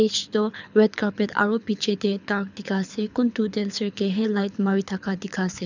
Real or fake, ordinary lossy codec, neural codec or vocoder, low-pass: fake; none; codec, 24 kHz, 6 kbps, HILCodec; 7.2 kHz